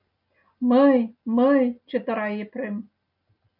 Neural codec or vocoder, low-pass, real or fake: none; 5.4 kHz; real